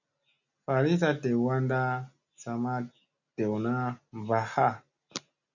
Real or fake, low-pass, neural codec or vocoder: real; 7.2 kHz; none